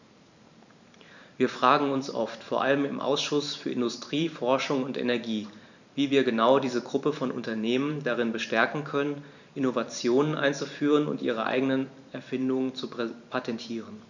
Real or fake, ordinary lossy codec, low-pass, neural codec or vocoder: fake; none; 7.2 kHz; vocoder, 44.1 kHz, 128 mel bands every 512 samples, BigVGAN v2